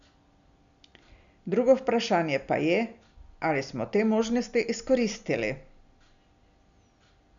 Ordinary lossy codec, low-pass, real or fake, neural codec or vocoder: MP3, 96 kbps; 7.2 kHz; real; none